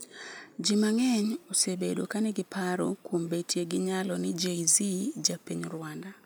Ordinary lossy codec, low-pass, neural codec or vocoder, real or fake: none; none; none; real